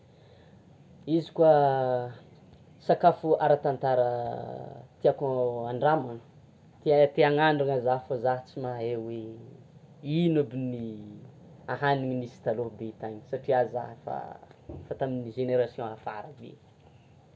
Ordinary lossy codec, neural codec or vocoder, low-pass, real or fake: none; none; none; real